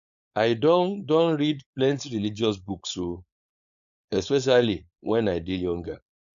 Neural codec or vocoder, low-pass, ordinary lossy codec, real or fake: codec, 16 kHz, 4.8 kbps, FACodec; 7.2 kHz; AAC, 96 kbps; fake